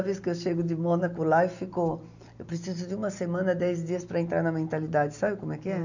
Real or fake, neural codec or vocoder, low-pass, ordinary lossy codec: real; none; 7.2 kHz; none